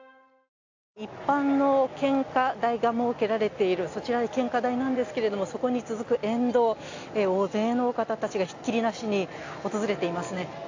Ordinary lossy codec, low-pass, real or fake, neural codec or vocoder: AAC, 48 kbps; 7.2 kHz; real; none